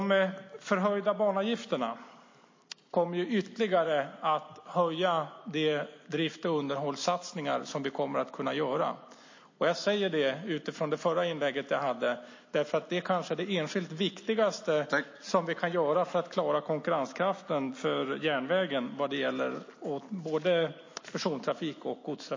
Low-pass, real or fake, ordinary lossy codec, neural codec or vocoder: 7.2 kHz; real; MP3, 32 kbps; none